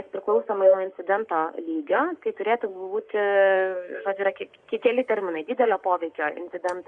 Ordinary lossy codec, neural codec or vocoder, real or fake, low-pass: Opus, 64 kbps; codec, 44.1 kHz, 7.8 kbps, Pupu-Codec; fake; 9.9 kHz